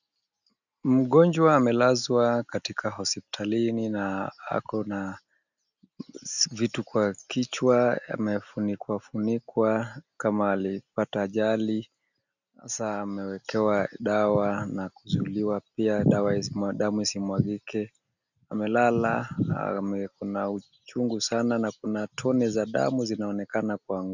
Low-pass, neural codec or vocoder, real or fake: 7.2 kHz; none; real